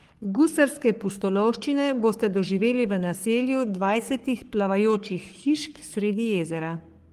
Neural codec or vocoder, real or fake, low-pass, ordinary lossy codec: codec, 44.1 kHz, 3.4 kbps, Pupu-Codec; fake; 14.4 kHz; Opus, 24 kbps